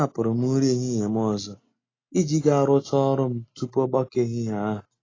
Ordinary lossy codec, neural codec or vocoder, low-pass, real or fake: AAC, 32 kbps; none; 7.2 kHz; real